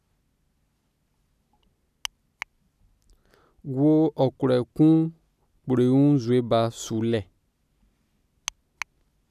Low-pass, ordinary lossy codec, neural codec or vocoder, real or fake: 14.4 kHz; none; none; real